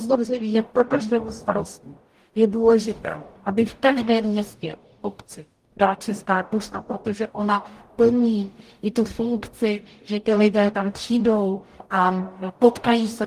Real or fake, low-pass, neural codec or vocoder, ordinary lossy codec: fake; 14.4 kHz; codec, 44.1 kHz, 0.9 kbps, DAC; Opus, 24 kbps